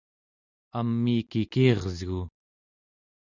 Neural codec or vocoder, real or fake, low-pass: none; real; 7.2 kHz